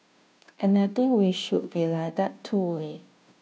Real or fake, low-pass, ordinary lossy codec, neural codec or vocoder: fake; none; none; codec, 16 kHz, 0.5 kbps, FunCodec, trained on Chinese and English, 25 frames a second